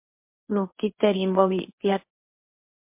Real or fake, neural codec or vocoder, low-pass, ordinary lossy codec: fake; codec, 24 kHz, 0.9 kbps, WavTokenizer, medium speech release version 1; 3.6 kHz; MP3, 24 kbps